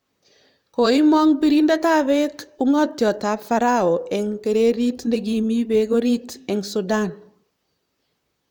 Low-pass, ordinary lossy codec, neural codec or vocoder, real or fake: 19.8 kHz; none; vocoder, 44.1 kHz, 128 mel bands, Pupu-Vocoder; fake